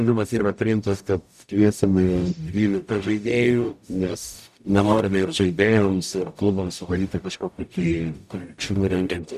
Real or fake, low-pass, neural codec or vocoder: fake; 14.4 kHz; codec, 44.1 kHz, 0.9 kbps, DAC